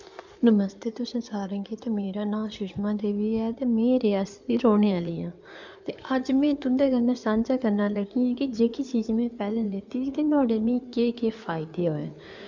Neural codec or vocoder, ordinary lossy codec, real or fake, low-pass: codec, 16 kHz in and 24 kHz out, 2.2 kbps, FireRedTTS-2 codec; none; fake; 7.2 kHz